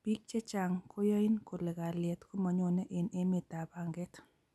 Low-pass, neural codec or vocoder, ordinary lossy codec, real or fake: none; none; none; real